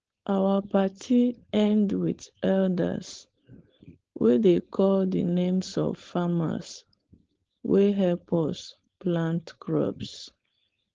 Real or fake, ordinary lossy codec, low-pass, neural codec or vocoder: fake; Opus, 16 kbps; 7.2 kHz; codec, 16 kHz, 4.8 kbps, FACodec